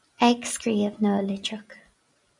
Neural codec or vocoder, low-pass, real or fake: none; 10.8 kHz; real